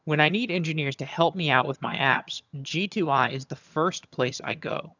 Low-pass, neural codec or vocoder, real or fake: 7.2 kHz; vocoder, 22.05 kHz, 80 mel bands, HiFi-GAN; fake